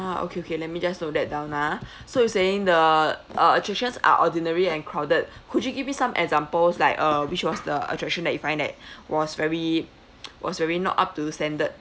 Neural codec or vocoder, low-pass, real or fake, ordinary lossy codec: none; none; real; none